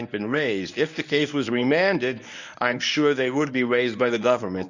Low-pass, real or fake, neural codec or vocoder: 7.2 kHz; fake; codec, 24 kHz, 0.9 kbps, WavTokenizer, medium speech release version 1